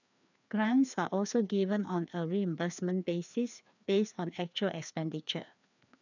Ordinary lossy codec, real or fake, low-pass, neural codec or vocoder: none; fake; 7.2 kHz; codec, 16 kHz, 2 kbps, FreqCodec, larger model